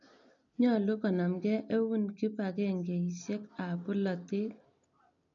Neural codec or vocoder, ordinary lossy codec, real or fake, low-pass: none; none; real; 7.2 kHz